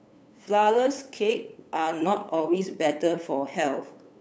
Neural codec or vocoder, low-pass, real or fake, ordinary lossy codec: codec, 16 kHz, 8 kbps, FunCodec, trained on LibriTTS, 25 frames a second; none; fake; none